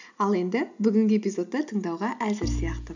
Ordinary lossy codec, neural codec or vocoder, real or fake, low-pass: none; none; real; 7.2 kHz